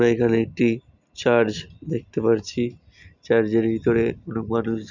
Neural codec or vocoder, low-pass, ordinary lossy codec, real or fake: none; 7.2 kHz; none; real